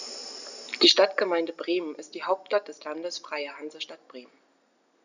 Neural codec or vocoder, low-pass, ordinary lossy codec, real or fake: none; 7.2 kHz; none; real